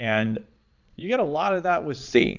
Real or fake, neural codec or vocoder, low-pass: fake; vocoder, 22.05 kHz, 80 mel bands, Vocos; 7.2 kHz